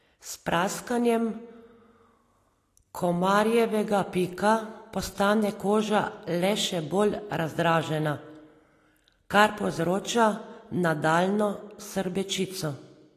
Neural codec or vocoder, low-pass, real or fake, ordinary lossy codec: none; 14.4 kHz; real; AAC, 48 kbps